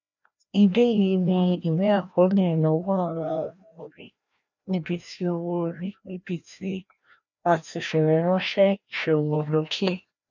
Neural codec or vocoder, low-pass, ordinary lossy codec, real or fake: codec, 16 kHz, 1 kbps, FreqCodec, larger model; 7.2 kHz; none; fake